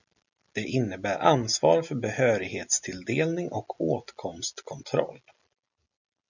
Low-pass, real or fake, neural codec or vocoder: 7.2 kHz; real; none